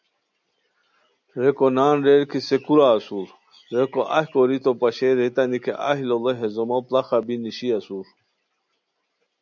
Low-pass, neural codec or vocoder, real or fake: 7.2 kHz; none; real